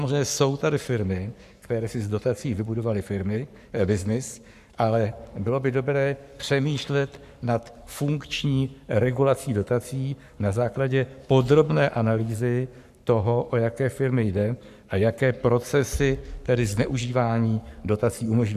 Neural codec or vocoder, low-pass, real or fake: codec, 44.1 kHz, 7.8 kbps, Pupu-Codec; 14.4 kHz; fake